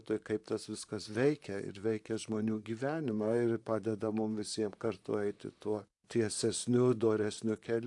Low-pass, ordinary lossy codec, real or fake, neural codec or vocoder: 10.8 kHz; AAC, 64 kbps; fake; vocoder, 44.1 kHz, 128 mel bands, Pupu-Vocoder